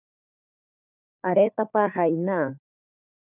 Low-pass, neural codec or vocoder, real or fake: 3.6 kHz; codec, 16 kHz, 4 kbps, FunCodec, trained on LibriTTS, 50 frames a second; fake